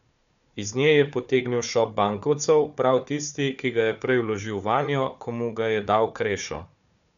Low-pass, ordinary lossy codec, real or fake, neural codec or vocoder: 7.2 kHz; none; fake; codec, 16 kHz, 4 kbps, FunCodec, trained on Chinese and English, 50 frames a second